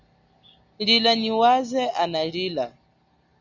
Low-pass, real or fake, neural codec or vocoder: 7.2 kHz; real; none